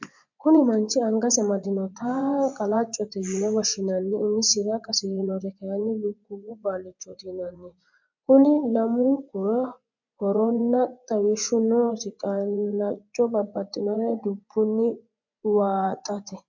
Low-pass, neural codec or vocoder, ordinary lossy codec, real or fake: 7.2 kHz; vocoder, 24 kHz, 100 mel bands, Vocos; MP3, 64 kbps; fake